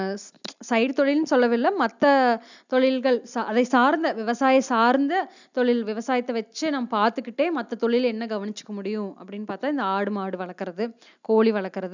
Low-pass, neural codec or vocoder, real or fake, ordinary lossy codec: 7.2 kHz; none; real; none